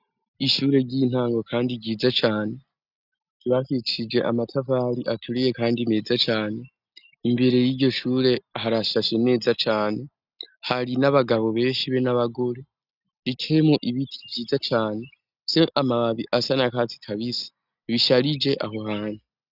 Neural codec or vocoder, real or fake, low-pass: none; real; 5.4 kHz